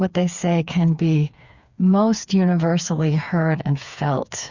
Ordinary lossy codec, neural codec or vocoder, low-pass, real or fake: Opus, 64 kbps; codec, 16 kHz, 4 kbps, FreqCodec, smaller model; 7.2 kHz; fake